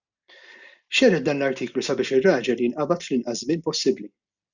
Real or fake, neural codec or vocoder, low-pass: real; none; 7.2 kHz